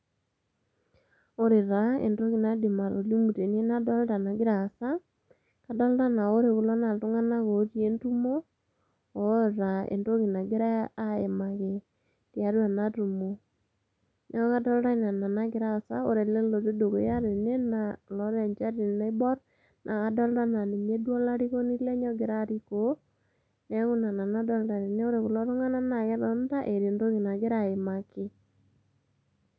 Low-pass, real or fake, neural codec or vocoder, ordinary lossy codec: none; real; none; none